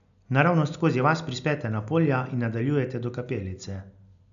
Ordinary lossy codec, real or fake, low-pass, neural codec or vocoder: none; real; 7.2 kHz; none